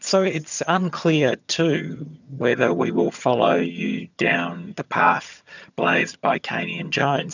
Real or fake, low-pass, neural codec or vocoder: fake; 7.2 kHz; vocoder, 22.05 kHz, 80 mel bands, HiFi-GAN